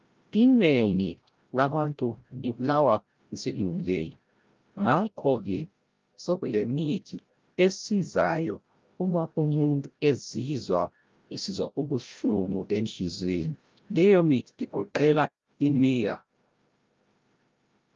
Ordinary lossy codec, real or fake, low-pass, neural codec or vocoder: Opus, 32 kbps; fake; 7.2 kHz; codec, 16 kHz, 0.5 kbps, FreqCodec, larger model